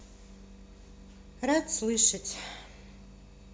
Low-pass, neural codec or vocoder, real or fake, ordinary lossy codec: none; none; real; none